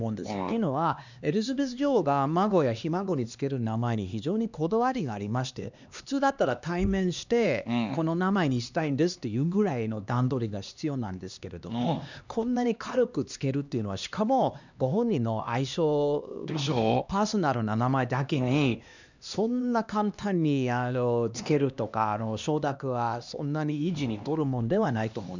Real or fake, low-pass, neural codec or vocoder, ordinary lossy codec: fake; 7.2 kHz; codec, 16 kHz, 2 kbps, X-Codec, HuBERT features, trained on LibriSpeech; none